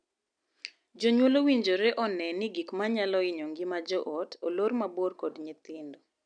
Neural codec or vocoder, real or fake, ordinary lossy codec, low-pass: none; real; none; 9.9 kHz